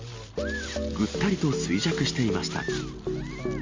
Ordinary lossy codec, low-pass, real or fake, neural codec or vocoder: Opus, 32 kbps; 7.2 kHz; real; none